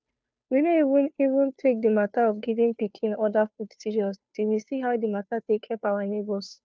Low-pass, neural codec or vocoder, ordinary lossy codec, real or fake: none; codec, 16 kHz, 2 kbps, FunCodec, trained on Chinese and English, 25 frames a second; none; fake